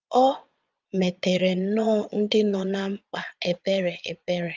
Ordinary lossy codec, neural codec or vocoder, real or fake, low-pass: Opus, 24 kbps; vocoder, 22.05 kHz, 80 mel bands, Vocos; fake; 7.2 kHz